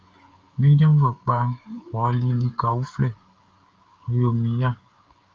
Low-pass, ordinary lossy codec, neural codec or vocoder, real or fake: 7.2 kHz; Opus, 32 kbps; codec, 16 kHz, 16 kbps, FreqCodec, smaller model; fake